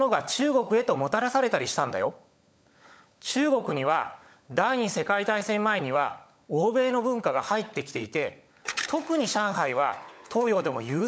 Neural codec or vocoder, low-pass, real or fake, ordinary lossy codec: codec, 16 kHz, 16 kbps, FunCodec, trained on LibriTTS, 50 frames a second; none; fake; none